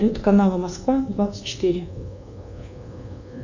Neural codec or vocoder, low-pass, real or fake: codec, 24 kHz, 1.2 kbps, DualCodec; 7.2 kHz; fake